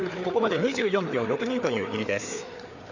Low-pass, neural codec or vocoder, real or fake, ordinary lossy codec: 7.2 kHz; codec, 16 kHz, 4 kbps, FreqCodec, larger model; fake; none